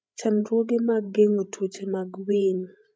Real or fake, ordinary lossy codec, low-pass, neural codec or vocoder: fake; none; none; codec, 16 kHz, 16 kbps, FreqCodec, larger model